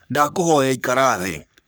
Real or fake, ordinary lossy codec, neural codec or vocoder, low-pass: fake; none; codec, 44.1 kHz, 3.4 kbps, Pupu-Codec; none